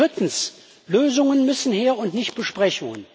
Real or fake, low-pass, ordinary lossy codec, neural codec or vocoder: real; none; none; none